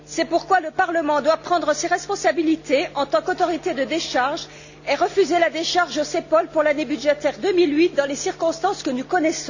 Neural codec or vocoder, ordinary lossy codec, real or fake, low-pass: none; none; real; 7.2 kHz